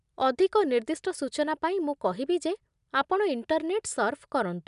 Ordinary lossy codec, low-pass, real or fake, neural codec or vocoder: MP3, 96 kbps; 10.8 kHz; real; none